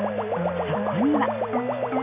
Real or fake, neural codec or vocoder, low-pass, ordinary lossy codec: real; none; 3.6 kHz; none